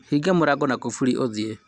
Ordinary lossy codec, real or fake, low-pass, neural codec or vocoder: Opus, 64 kbps; real; 9.9 kHz; none